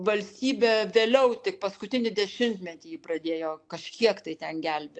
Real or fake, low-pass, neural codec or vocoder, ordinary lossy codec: real; 9.9 kHz; none; AAC, 64 kbps